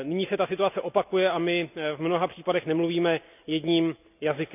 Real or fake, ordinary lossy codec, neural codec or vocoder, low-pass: real; none; none; 3.6 kHz